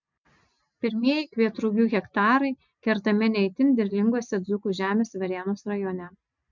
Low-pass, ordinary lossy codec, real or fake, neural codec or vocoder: 7.2 kHz; MP3, 64 kbps; real; none